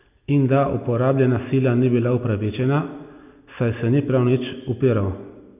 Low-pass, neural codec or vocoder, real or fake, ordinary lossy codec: 3.6 kHz; none; real; none